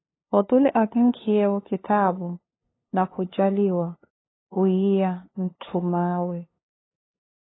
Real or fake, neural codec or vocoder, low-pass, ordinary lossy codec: fake; codec, 16 kHz, 2 kbps, FunCodec, trained on LibriTTS, 25 frames a second; 7.2 kHz; AAC, 16 kbps